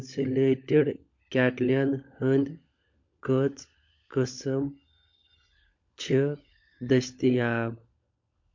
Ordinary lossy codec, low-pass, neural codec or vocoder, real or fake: MP3, 48 kbps; 7.2 kHz; codec, 16 kHz, 16 kbps, FunCodec, trained on LibriTTS, 50 frames a second; fake